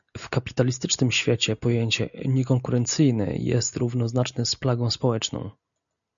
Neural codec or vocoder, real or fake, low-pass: none; real; 7.2 kHz